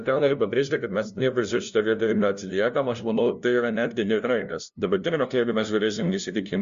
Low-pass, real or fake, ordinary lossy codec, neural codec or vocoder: 7.2 kHz; fake; Opus, 64 kbps; codec, 16 kHz, 0.5 kbps, FunCodec, trained on LibriTTS, 25 frames a second